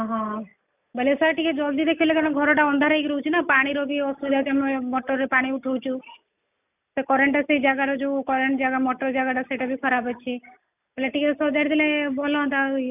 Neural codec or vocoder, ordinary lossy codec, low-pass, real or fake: none; none; 3.6 kHz; real